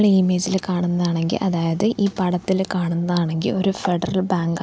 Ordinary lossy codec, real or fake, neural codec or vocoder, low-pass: none; real; none; none